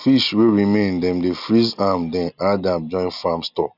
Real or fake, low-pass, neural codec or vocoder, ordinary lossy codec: real; 5.4 kHz; none; none